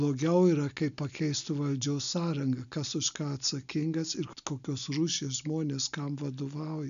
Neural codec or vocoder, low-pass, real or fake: none; 7.2 kHz; real